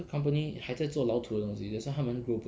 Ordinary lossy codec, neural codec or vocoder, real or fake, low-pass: none; none; real; none